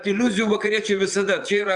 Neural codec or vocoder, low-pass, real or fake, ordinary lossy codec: vocoder, 22.05 kHz, 80 mel bands, Vocos; 9.9 kHz; fake; Opus, 64 kbps